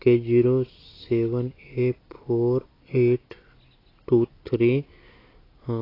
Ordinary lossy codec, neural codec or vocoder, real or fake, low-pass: AAC, 24 kbps; none; real; 5.4 kHz